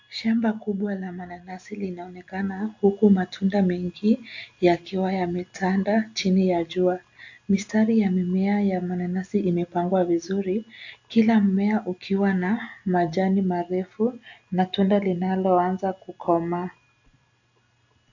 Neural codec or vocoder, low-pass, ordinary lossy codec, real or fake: none; 7.2 kHz; AAC, 48 kbps; real